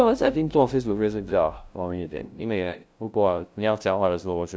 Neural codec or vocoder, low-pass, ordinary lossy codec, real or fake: codec, 16 kHz, 0.5 kbps, FunCodec, trained on LibriTTS, 25 frames a second; none; none; fake